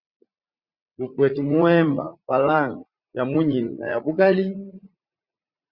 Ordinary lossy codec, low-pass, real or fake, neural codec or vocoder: Opus, 64 kbps; 5.4 kHz; fake; vocoder, 44.1 kHz, 80 mel bands, Vocos